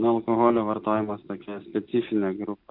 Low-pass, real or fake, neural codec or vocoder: 5.4 kHz; real; none